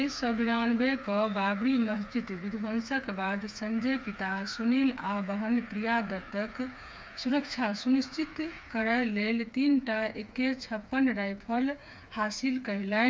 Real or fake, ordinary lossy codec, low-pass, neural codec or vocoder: fake; none; none; codec, 16 kHz, 4 kbps, FreqCodec, smaller model